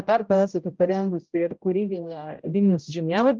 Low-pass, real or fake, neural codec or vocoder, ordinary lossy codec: 7.2 kHz; fake; codec, 16 kHz, 0.5 kbps, X-Codec, HuBERT features, trained on general audio; Opus, 32 kbps